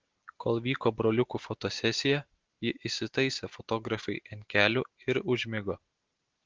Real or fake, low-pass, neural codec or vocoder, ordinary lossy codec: real; 7.2 kHz; none; Opus, 16 kbps